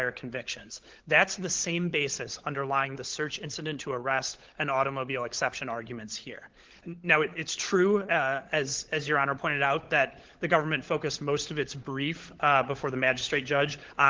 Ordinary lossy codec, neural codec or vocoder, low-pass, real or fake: Opus, 16 kbps; codec, 16 kHz, 16 kbps, FunCodec, trained on LibriTTS, 50 frames a second; 7.2 kHz; fake